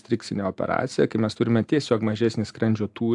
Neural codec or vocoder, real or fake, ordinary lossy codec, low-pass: none; real; AAC, 64 kbps; 10.8 kHz